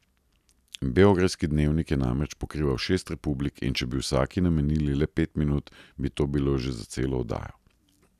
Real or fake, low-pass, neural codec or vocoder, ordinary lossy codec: real; 14.4 kHz; none; none